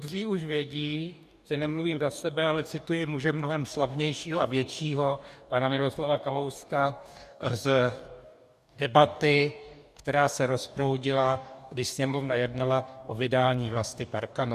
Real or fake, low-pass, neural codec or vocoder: fake; 14.4 kHz; codec, 44.1 kHz, 2.6 kbps, DAC